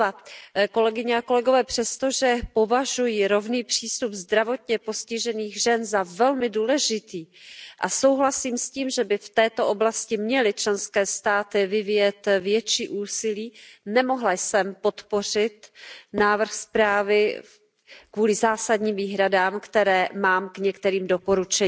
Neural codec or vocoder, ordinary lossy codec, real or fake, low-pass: none; none; real; none